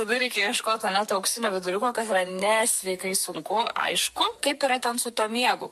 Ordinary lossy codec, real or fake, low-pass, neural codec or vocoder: MP3, 64 kbps; fake; 14.4 kHz; codec, 44.1 kHz, 2.6 kbps, SNAC